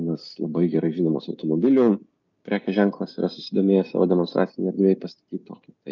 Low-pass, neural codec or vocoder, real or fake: 7.2 kHz; none; real